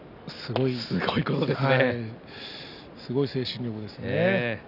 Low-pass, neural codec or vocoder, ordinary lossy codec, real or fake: 5.4 kHz; none; none; real